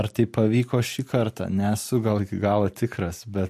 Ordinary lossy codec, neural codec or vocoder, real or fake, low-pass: MP3, 64 kbps; none; real; 14.4 kHz